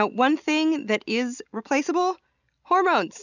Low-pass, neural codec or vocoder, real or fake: 7.2 kHz; none; real